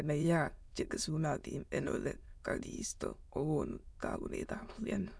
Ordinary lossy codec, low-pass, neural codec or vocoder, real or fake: none; none; autoencoder, 22.05 kHz, a latent of 192 numbers a frame, VITS, trained on many speakers; fake